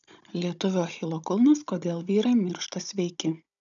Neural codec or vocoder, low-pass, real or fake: codec, 16 kHz, 16 kbps, FunCodec, trained on Chinese and English, 50 frames a second; 7.2 kHz; fake